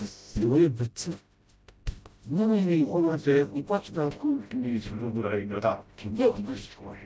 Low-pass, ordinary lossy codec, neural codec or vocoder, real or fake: none; none; codec, 16 kHz, 0.5 kbps, FreqCodec, smaller model; fake